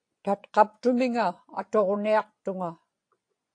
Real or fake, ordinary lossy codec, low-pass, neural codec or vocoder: fake; AAC, 48 kbps; 9.9 kHz; vocoder, 24 kHz, 100 mel bands, Vocos